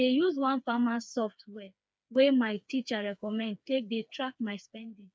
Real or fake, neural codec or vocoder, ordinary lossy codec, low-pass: fake; codec, 16 kHz, 4 kbps, FreqCodec, smaller model; none; none